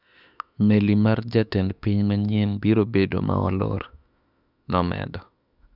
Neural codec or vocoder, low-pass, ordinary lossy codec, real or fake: autoencoder, 48 kHz, 32 numbers a frame, DAC-VAE, trained on Japanese speech; 5.4 kHz; none; fake